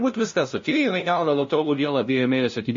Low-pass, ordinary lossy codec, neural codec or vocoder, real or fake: 7.2 kHz; MP3, 32 kbps; codec, 16 kHz, 0.5 kbps, FunCodec, trained on LibriTTS, 25 frames a second; fake